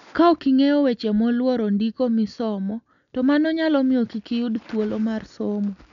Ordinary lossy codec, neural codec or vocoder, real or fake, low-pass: none; none; real; 7.2 kHz